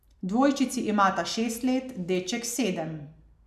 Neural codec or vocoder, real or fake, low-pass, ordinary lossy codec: none; real; 14.4 kHz; none